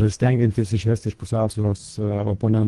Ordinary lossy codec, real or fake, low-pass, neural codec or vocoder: Opus, 24 kbps; fake; 10.8 kHz; codec, 24 kHz, 1.5 kbps, HILCodec